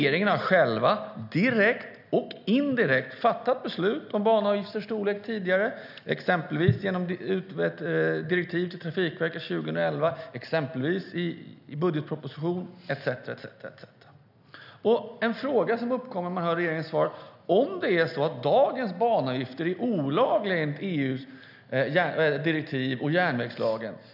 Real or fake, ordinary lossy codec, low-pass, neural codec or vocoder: real; none; 5.4 kHz; none